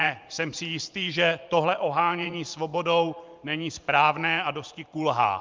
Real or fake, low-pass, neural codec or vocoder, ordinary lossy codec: fake; 7.2 kHz; vocoder, 44.1 kHz, 128 mel bands every 512 samples, BigVGAN v2; Opus, 24 kbps